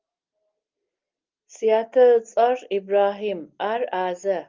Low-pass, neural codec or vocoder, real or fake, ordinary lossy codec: 7.2 kHz; none; real; Opus, 32 kbps